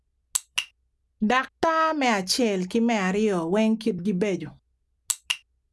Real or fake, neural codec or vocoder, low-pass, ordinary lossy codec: real; none; none; none